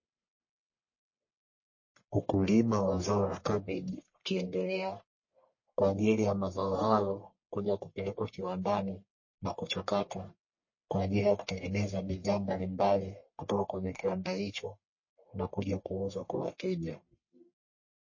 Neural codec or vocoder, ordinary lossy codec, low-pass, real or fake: codec, 44.1 kHz, 1.7 kbps, Pupu-Codec; MP3, 32 kbps; 7.2 kHz; fake